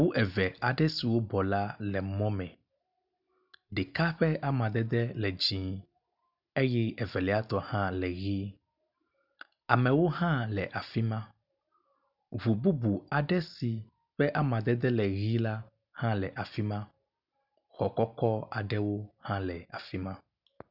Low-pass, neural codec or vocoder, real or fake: 5.4 kHz; none; real